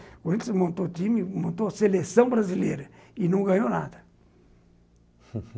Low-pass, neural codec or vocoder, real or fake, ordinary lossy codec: none; none; real; none